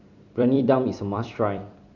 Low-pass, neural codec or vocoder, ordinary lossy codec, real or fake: 7.2 kHz; vocoder, 44.1 kHz, 128 mel bands every 256 samples, BigVGAN v2; MP3, 64 kbps; fake